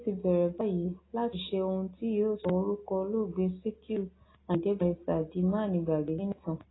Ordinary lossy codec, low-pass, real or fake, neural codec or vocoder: AAC, 16 kbps; 7.2 kHz; real; none